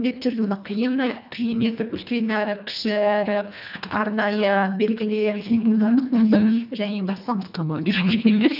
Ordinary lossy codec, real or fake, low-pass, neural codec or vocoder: none; fake; 5.4 kHz; codec, 24 kHz, 1.5 kbps, HILCodec